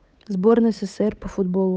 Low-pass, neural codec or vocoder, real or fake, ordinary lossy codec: none; codec, 16 kHz, 8 kbps, FunCodec, trained on Chinese and English, 25 frames a second; fake; none